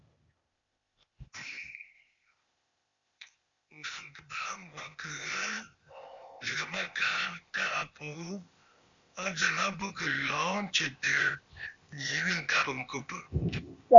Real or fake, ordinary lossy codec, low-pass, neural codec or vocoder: fake; MP3, 64 kbps; 7.2 kHz; codec, 16 kHz, 0.8 kbps, ZipCodec